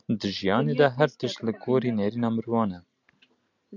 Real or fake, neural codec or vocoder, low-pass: real; none; 7.2 kHz